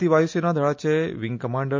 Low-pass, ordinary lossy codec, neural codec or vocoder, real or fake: 7.2 kHz; MP3, 48 kbps; none; real